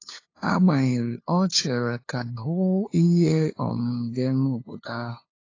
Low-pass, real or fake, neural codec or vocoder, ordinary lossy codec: 7.2 kHz; fake; codec, 16 kHz, 2 kbps, FunCodec, trained on LibriTTS, 25 frames a second; AAC, 32 kbps